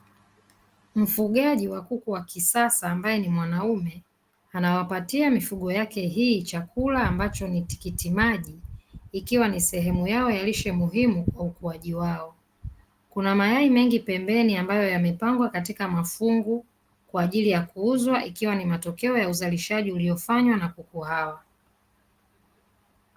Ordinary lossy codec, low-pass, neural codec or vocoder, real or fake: Opus, 24 kbps; 14.4 kHz; none; real